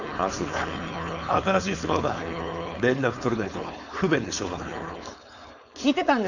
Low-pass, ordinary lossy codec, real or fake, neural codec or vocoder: 7.2 kHz; none; fake; codec, 16 kHz, 4.8 kbps, FACodec